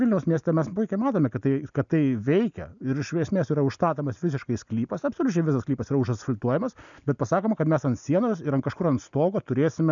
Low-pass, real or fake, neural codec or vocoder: 7.2 kHz; real; none